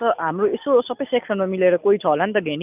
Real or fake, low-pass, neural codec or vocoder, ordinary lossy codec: real; 3.6 kHz; none; none